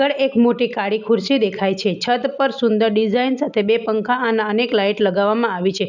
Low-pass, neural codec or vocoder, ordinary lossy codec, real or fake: 7.2 kHz; none; none; real